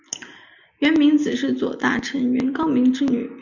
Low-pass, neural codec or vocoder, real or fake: 7.2 kHz; none; real